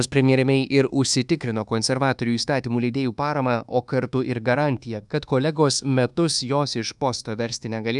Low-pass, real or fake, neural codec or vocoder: 10.8 kHz; fake; codec, 24 kHz, 1.2 kbps, DualCodec